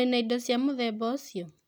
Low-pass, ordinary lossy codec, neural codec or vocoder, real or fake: none; none; none; real